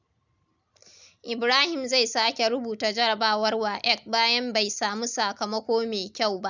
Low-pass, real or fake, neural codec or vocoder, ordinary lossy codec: 7.2 kHz; real; none; none